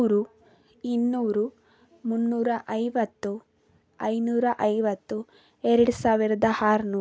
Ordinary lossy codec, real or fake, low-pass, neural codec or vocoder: none; real; none; none